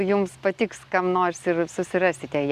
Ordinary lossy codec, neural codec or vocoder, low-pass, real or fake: Opus, 64 kbps; none; 14.4 kHz; real